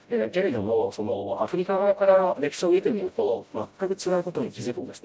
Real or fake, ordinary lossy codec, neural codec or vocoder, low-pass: fake; none; codec, 16 kHz, 0.5 kbps, FreqCodec, smaller model; none